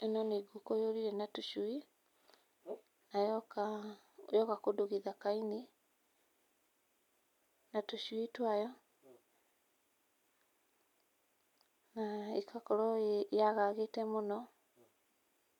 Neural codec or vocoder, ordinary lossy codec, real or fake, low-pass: none; none; real; 19.8 kHz